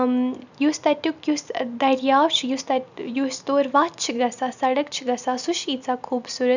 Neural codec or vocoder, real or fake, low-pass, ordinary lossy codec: none; real; 7.2 kHz; none